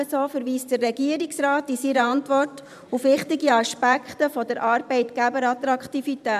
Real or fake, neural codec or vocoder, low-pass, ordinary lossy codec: fake; vocoder, 44.1 kHz, 128 mel bands every 512 samples, BigVGAN v2; 14.4 kHz; none